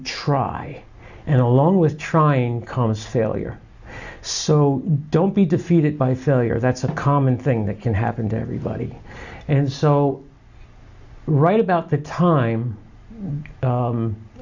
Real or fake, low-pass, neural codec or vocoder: real; 7.2 kHz; none